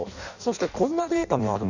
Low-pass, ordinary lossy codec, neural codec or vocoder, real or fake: 7.2 kHz; none; codec, 16 kHz in and 24 kHz out, 0.6 kbps, FireRedTTS-2 codec; fake